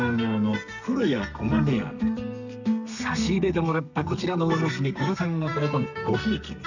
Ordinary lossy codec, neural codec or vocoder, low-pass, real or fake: none; codec, 32 kHz, 1.9 kbps, SNAC; 7.2 kHz; fake